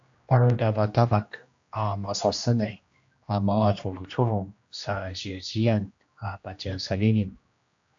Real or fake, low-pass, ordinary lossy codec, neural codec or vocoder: fake; 7.2 kHz; AAC, 64 kbps; codec, 16 kHz, 1 kbps, X-Codec, HuBERT features, trained on general audio